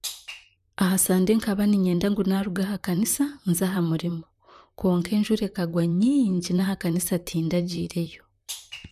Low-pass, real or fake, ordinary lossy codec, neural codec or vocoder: 14.4 kHz; fake; none; vocoder, 44.1 kHz, 128 mel bands, Pupu-Vocoder